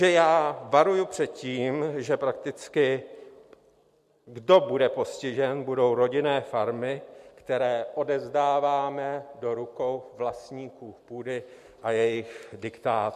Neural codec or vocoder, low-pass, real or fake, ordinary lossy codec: none; 14.4 kHz; real; MP3, 64 kbps